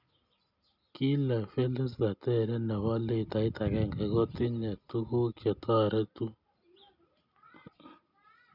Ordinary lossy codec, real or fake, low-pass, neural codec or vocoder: none; real; 5.4 kHz; none